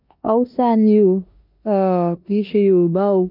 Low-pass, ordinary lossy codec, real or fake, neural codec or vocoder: 5.4 kHz; none; fake; codec, 16 kHz in and 24 kHz out, 0.9 kbps, LongCat-Audio-Codec, four codebook decoder